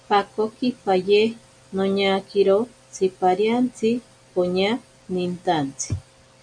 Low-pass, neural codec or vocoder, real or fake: 9.9 kHz; none; real